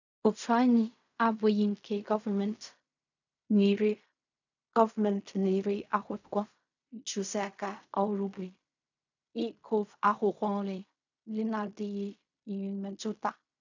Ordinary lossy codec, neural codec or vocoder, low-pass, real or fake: none; codec, 16 kHz in and 24 kHz out, 0.4 kbps, LongCat-Audio-Codec, fine tuned four codebook decoder; 7.2 kHz; fake